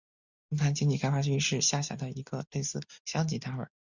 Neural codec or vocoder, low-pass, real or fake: none; 7.2 kHz; real